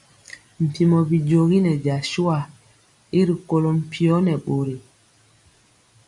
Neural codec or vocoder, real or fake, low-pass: none; real; 10.8 kHz